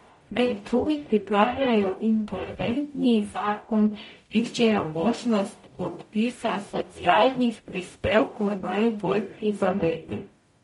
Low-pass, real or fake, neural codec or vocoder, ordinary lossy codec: 19.8 kHz; fake; codec, 44.1 kHz, 0.9 kbps, DAC; MP3, 48 kbps